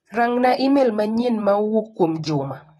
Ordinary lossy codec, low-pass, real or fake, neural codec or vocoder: AAC, 32 kbps; 19.8 kHz; fake; vocoder, 44.1 kHz, 128 mel bands, Pupu-Vocoder